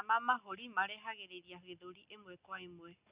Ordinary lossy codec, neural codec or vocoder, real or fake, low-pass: Opus, 64 kbps; none; real; 3.6 kHz